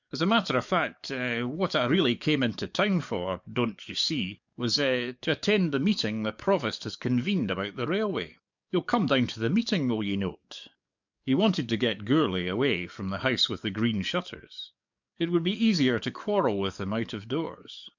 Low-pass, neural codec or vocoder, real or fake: 7.2 kHz; codec, 44.1 kHz, 7.8 kbps, DAC; fake